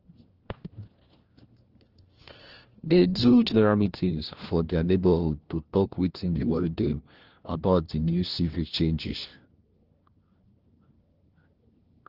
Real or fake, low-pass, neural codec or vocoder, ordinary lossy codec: fake; 5.4 kHz; codec, 16 kHz, 1 kbps, FunCodec, trained on LibriTTS, 50 frames a second; Opus, 16 kbps